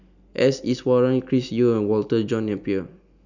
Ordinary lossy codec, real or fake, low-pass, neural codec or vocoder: none; real; 7.2 kHz; none